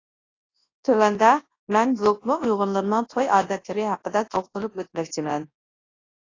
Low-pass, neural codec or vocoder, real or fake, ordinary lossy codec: 7.2 kHz; codec, 24 kHz, 0.9 kbps, WavTokenizer, large speech release; fake; AAC, 32 kbps